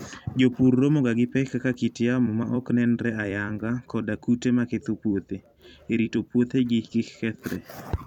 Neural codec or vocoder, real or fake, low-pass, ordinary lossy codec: vocoder, 44.1 kHz, 128 mel bands every 256 samples, BigVGAN v2; fake; 19.8 kHz; none